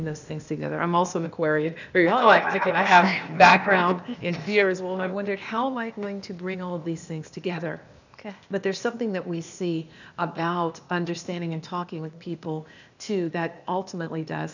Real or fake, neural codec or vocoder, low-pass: fake; codec, 16 kHz, 0.8 kbps, ZipCodec; 7.2 kHz